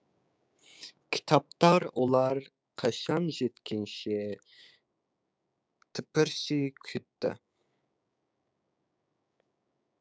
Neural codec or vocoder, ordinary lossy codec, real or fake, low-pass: codec, 16 kHz, 6 kbps, DAC; none; fake; none